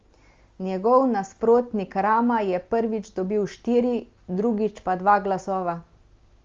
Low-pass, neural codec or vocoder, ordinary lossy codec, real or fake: 7.2 kHz; none; Opus, 32 kbps; real